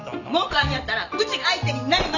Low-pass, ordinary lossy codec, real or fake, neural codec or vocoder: 7.2 kHz; none; real; none